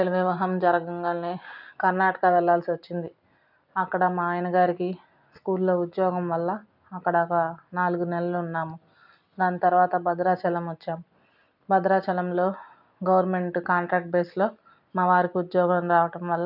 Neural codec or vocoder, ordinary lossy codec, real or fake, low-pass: none; none; real; 5.4 kHz